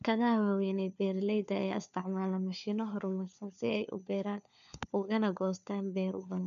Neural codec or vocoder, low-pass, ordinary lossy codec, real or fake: codec, 16 kHz, 4 kbps, FunCodec, trained on LibriTTS, 50 frames a second; 7.2 kHz; none; fake